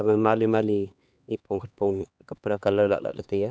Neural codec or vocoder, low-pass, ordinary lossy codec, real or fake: codec, 16 kHz, 2 kbps, X-Codec, HuBERT features, trained on LibriSpeech; none; none; fake